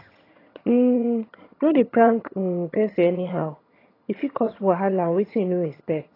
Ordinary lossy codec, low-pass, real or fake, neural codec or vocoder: AAC, 24 kbps; 5.4 kHz; fake; vocoder, 22.05 kHz, 80 mel bands, HiFi-GAN